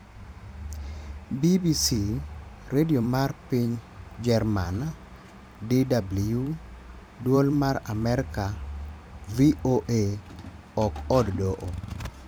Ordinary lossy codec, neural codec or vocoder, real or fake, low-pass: none; vocoder, 44.1 kHz, 128 mel bands every 512 samples, BigVGAN v2; fake; none